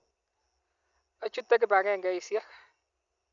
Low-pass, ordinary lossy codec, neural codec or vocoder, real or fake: 7.2 kHz; none; none; real